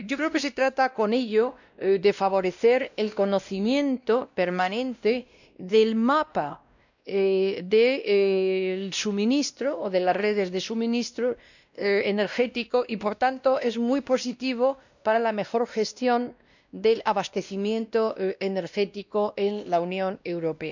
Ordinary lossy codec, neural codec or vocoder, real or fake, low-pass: none; codec, 16 kHz, 1 kbps, X-Codec, WavLM features, trained on Multilingual LibriSpeech; fake; 7.2 kHz